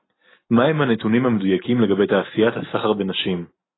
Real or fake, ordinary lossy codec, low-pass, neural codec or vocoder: real; AAC, 16 kbps; 7.2 kHz; none